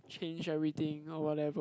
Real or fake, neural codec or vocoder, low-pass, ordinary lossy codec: real; none; none; none